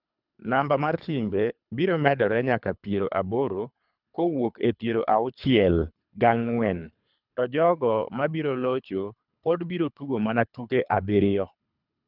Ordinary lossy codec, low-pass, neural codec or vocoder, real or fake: none; 5.4 kHz; codec, 24 kHz, 3 kbps, HILCodec; fake